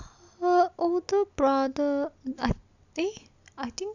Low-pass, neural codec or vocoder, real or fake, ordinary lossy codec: 7.2 kHz; none; real; none